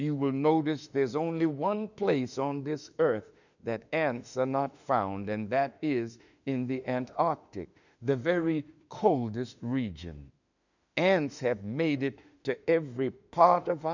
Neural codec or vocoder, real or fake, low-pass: autoencoder, 48 kHz, 32 numbers a frame, DAC-VAE, trained on Japanese speech; fake; 7.2 kHz